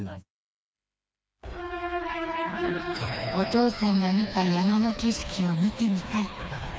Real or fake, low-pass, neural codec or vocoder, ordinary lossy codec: fake; none; codec, 16 kHz, 2 kbps, FreqCodec, smaller model; none